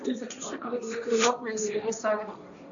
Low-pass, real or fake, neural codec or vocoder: 7.2 kHz; fake; codec, 16 kHz, 1.1 kbps, Voila-Tokenizer